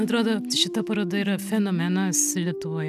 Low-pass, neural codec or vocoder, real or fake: 14.4 kHz; none; real